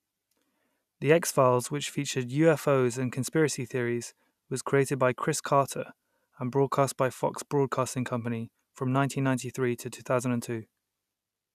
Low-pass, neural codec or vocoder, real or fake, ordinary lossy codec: 14.4 kHz; none; real; none